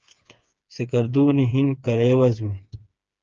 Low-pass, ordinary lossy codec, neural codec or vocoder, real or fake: 7.2 kHz; Opus, 24 kbps; codec, 16 kHz, 4 kbps, FreqCodec, smaller model; fake